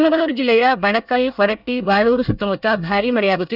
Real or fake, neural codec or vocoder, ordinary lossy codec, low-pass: fake; codec, 24 kHz, 1 kbps, SNAC; none; 5.4 kHz